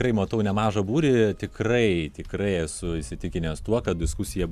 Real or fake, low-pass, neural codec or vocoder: real; 14.4 kHz; none